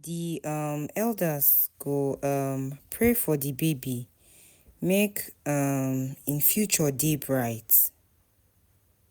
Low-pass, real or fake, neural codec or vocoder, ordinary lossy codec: none; real; none; none